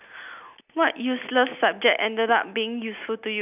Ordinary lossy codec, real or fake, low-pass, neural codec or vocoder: none; real; 3.6 kHz; none